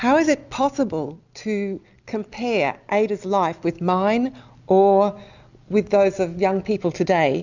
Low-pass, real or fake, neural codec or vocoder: 7.2 kHz; real; none